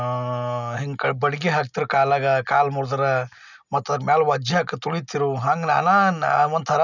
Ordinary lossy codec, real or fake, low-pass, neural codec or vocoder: none; real; 7.2 kHz; none